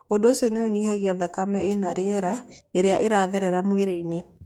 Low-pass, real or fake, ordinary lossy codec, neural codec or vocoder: 19.8 kHz; fake; MP3, 96 kbps; codec, 44.1 kHz, 2.6 kbps, DAC